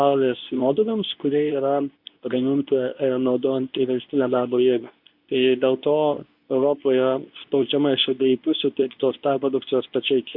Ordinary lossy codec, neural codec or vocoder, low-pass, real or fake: MP3, 48 kbps; codec, 24 kHz, 0.9 kbps, WavTokenizer, medium speech release version 2; 5.4 kHz; fake